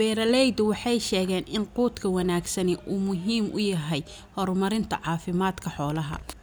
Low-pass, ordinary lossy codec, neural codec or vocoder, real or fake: none; none; none; real